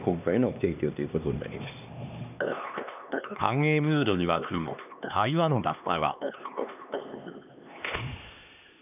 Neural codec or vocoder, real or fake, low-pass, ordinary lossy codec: codec, 16 kHz, 2 kbps, X-Codec, HuBERT features, trained on LibriSpeech; fake; 3.6 kHz; none